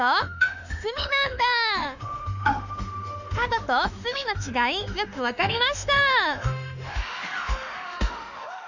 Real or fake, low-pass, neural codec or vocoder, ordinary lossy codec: fake; 7.2 kHz; autoencoder, 48 kHz, 32 numbers a frame, DAC-VAE, trained on Japanese speech; none